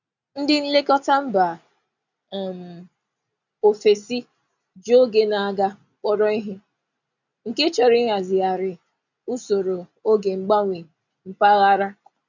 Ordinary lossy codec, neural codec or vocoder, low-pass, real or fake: none; none; 7.2 kHz; real